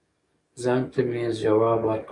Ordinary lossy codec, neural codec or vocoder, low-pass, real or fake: MP3, 96 kbps; codec, 44.1 kHz, 7.8 kbps, DAC; 10.8 kHz; fake